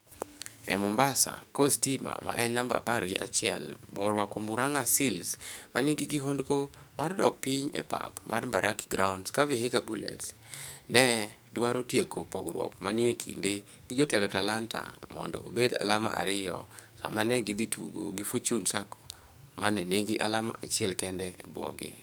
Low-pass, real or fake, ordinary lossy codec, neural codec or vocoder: none; fake; none; codec, 44.1 kHz, 2.6 kbps, SNAC